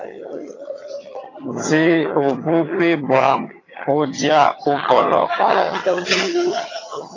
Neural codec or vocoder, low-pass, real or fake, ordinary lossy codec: vocoder, 22.05 kHz, 80 mel bands, HiFi-GAN; 7.2 kHz; fake; AAC, 32 kbps